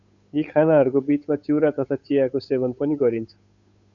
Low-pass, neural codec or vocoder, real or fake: 7.2 kHz; codec, 16 kHz, 8 kbps, FunCodec, trained on Chinese and English, 25 frames a second; fake